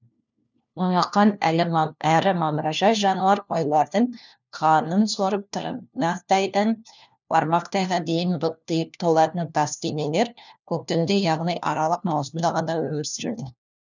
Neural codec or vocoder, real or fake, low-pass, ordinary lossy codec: codec, 16 kHz, 1 kbps, FunCodec, trained on LibriTTS, 50 frames a second; fake; 7.2 kHz; none